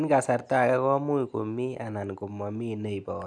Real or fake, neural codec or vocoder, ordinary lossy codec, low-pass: real; none; none; none